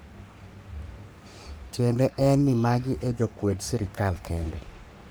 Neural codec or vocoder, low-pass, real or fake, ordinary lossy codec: codec, 44.1 kHz, 3.4 kbps, Pupu-Codec; none; fake; none